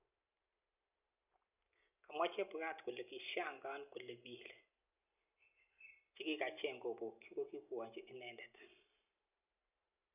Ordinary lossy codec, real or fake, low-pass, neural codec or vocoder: none; real; 3.6 kHz; none